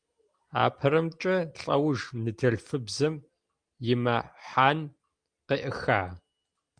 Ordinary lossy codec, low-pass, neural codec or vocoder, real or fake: Opus, 32 kbps; 9.9 kHz; none; real